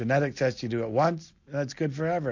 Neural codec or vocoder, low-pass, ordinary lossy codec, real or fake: none; 7.2 kHz; MP3, 48 kbps; real